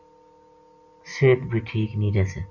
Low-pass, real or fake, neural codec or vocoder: 7.2 kHz; real; none